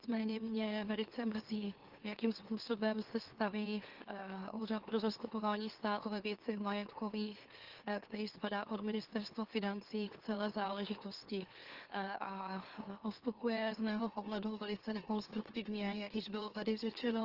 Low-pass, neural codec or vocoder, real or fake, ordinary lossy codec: 5.4 kHz; autoencoder, 44.1 kHz, a latent of 192 numbers a frame, MeloTTS; fake; Opus, 16 kbps